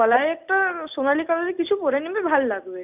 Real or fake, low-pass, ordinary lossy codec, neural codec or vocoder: real; 3.6 kHz; none; none